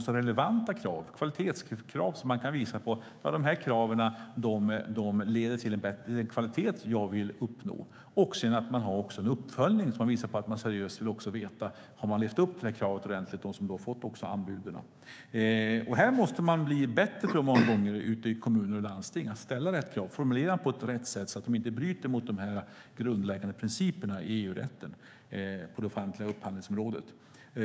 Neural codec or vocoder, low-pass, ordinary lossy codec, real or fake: codec, 16 kHz, 6 kbps, DAC; none; none; fake